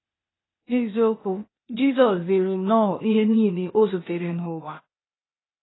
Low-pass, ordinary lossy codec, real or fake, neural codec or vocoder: 7.2 kHz; AAC, 16 kbps; fake; codec, 16 kHz, 0.8 kbps, ZipCodec